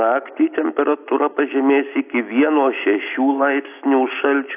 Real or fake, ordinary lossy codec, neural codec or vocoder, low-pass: real; AAC, 32 kbps; none; 3.6 kHz